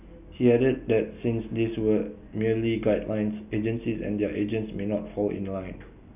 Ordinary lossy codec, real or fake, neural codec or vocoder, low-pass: none; real; none; 3.6 kHz